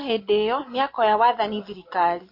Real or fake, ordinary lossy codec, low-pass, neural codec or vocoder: real; MP3, 32 kbps; 5.4 kHz; none